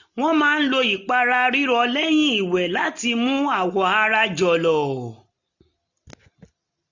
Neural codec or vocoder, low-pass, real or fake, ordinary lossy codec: none; 7.2 kHz; real; none